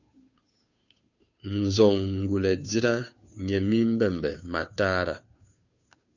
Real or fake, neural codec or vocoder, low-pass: fake; codec, 16 kHz, 2 kbps, FunCodec, trained on Chinese and English, 25 frames a second; 7.2 kHz